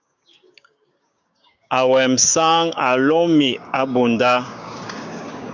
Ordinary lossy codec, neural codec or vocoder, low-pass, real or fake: Opus, 64 kbps; codec, 16 kHz, 6 kbps, DAC; 7.2 kHz; fake